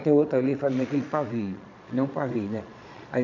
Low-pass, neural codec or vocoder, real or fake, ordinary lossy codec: 7.2 kHz; vocoder, 22.05 kHz, 80 mel bands, Vocos; fake; none